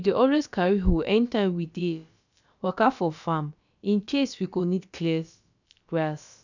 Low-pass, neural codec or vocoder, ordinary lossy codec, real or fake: 7.2 kHz; codec, 16 kHz, about 1 kbps, DyCAST, with the encoder's durations; none; fake